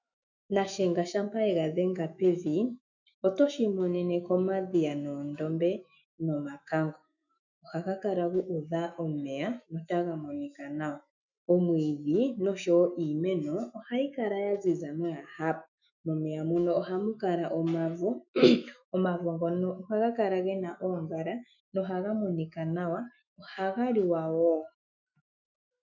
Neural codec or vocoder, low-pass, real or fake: autoencoder, 48 kHz, 128 numbers a frame, DAC-VAE, trained on Japanese speech; 7.2 kHz; fake